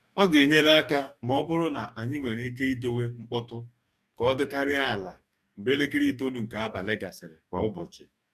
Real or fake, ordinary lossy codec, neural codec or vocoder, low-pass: fake; none; codec, 44.1 kHz, 2.6 kbps, DAC; 14.4 kHz